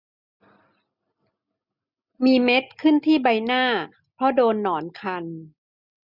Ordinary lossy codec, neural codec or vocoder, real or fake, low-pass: none; none; real; 5.4 kHz